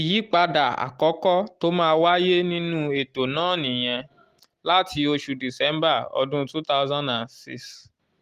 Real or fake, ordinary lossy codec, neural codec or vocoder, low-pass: real; Opus, 24 kbps; none; 14.4 kHz